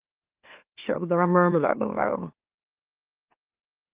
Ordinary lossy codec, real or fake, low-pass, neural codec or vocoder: Opus, 24 kbps; fake; 3.6 kHz; autoencoder, 44.1 kHz, a latent of 192 numbers a frame, MeloTTS